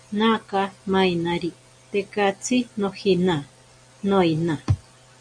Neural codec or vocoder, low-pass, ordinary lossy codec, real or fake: none; 9.9 kHz; MP3, 96 kbps; real